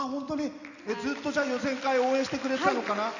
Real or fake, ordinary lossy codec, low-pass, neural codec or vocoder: real; none; 7.2 kHz; none